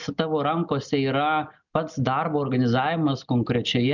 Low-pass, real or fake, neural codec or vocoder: 7.2 kHz; real; none